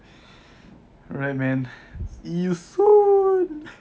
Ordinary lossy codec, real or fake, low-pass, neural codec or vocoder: none; real; none; none